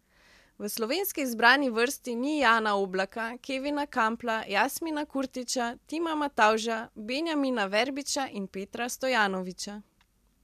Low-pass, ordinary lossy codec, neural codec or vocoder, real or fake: 14.4 kHz; MP3, 96 kbps; none; real